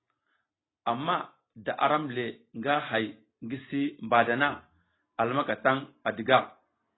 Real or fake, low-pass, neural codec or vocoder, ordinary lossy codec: real; 7.2 kHz; none; AAC, 16 kbps